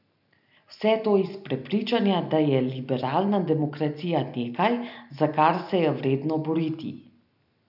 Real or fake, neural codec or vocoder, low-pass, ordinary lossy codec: real; none; 5.4 kHz; none